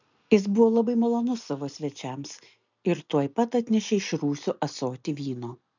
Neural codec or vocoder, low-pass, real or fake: none; 7.2 kHz; real